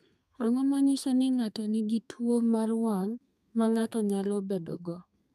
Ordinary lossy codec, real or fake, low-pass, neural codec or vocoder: none; fake; 14.4 kHz; codec, 32 kHz, 1.9 kbps, SNAC